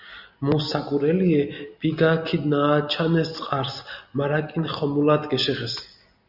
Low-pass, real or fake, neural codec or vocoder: 5.4 kHz; real; none